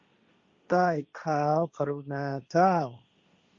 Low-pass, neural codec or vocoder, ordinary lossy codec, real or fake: 7.2 kHz; codec, 16 kHz, 1.1 kbps, Voila-Tokenizer; Opus, 64 kbps; fake